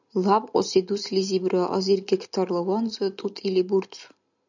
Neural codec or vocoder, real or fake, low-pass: none; real; 7.2 kHz